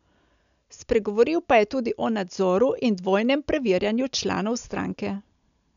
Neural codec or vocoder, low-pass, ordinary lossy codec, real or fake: none; 7.2 kHz; none; real